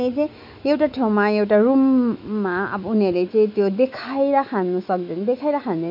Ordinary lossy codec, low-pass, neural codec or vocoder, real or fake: MP3, 48 kbps; 5.4 kHz; autoencoder, 48 kHz, 128 numbers a frame, DAC-VAE, trained on Japanese speech; fake